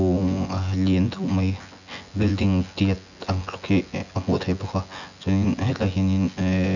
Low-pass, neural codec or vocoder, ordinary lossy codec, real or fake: 7.2 kHz; vocoder, 24 kHz, 100 mel bands, Vocos; none; fake